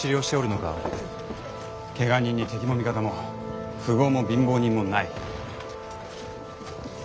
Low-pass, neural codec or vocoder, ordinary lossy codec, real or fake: none; none; none; real